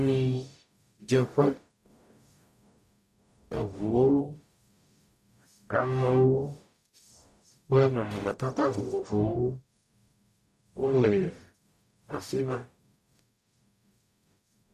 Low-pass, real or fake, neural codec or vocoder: 14.4 kHz; fake; codec, 44.1 kHz, 0.9 kbps, DAC